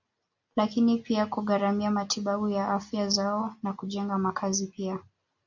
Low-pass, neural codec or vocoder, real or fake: 7.2 kHz; none; real